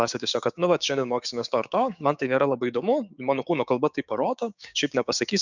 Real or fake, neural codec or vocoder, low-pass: fake; codec, 16 kHz, 6 kbps, DAC; 7.2 kHz